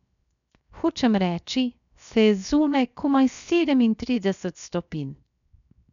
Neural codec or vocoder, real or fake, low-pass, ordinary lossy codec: codec, 16 kHz, 0.7 kbps, FocalCodec; fake; 7.2 kHz; none